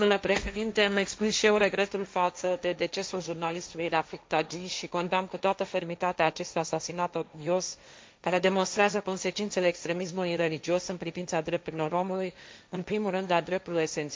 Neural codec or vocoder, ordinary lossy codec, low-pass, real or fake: codec, 16 kHz, 1.1 kbps, Voila-Tokenizer; none; none; fake